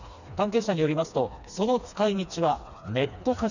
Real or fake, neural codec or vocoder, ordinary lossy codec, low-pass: fake; codec, 16 kHz, 2 kbps, FreqCodec, smaller model; none; 7.2 kHz